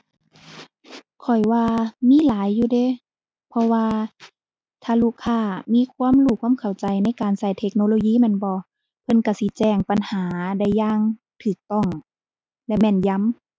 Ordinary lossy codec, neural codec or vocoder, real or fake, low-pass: none; none; real; none